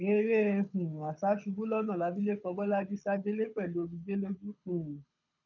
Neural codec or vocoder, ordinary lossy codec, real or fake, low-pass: codec, 24 kHz, 6 kbps, HILCodec; none; fake; 7.2 kHz